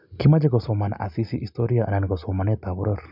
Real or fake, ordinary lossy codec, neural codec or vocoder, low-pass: real; none; none; 5.4 kHz